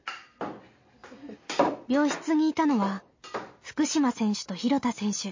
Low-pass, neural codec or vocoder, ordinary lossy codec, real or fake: 7.2 kHz; none; MP3, 32 kbps; real